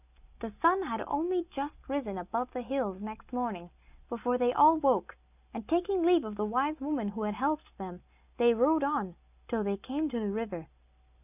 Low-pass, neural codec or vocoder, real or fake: 3.6 kHz; none; real